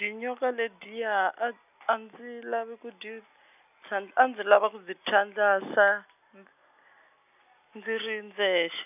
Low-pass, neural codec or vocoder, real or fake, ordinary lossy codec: 3.6 kHz; none; real; none